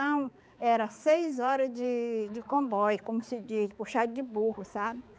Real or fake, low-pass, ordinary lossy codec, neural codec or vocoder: fake; none; none; codec, 16 kHz, 4 kbps, X-Codec, HuBERT features, trained on balanced general audio